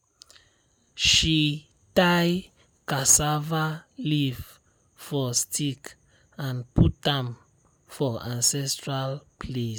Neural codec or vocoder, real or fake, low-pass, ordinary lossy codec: none; real; none; none